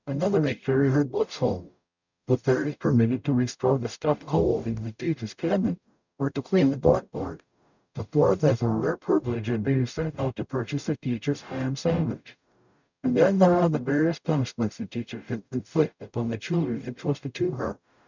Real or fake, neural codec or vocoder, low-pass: fake; codec, 44.1 kHz, 0.9 kbps, DAC; 7.2 kHz